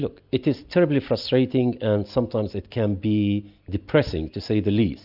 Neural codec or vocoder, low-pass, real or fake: none; 5.4 kHz; real